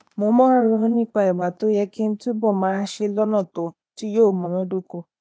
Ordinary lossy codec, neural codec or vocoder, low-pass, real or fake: none; codec, 16 kHz, 0.8 kbps, ZipCodec; none; fake